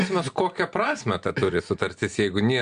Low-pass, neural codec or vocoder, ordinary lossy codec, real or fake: 9.9 kHz; none; Opus, 64 kbps; real